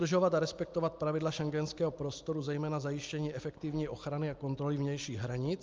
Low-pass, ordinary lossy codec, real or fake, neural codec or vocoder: 7.2 kHz; Opus, 24 kbps; real; none